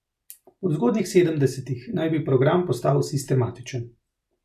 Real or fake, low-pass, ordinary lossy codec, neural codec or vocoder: real; 14.4 kHz; none; none